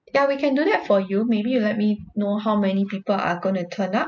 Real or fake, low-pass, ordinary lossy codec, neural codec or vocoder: real; 7.2 kHz; none; none